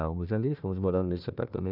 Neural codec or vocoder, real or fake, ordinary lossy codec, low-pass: codec, 16 kHz, 1 kbps, FunCodec, trained on Chinese and English, 50 frames a second; fake; none; 5.4 kHz